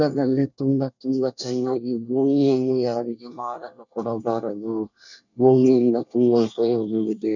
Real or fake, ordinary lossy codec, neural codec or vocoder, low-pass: fake; none; codec, 24 kHz, 1 kbps, SNAC; 7.2 kHz